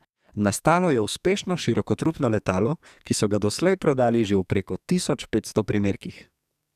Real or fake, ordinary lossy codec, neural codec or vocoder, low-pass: fake; none; codec, 44.1 kHz, 2.6 kbps, SNAC; 14.4 kHz